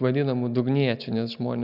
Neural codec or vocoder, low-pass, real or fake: none; 5.4 kHz; real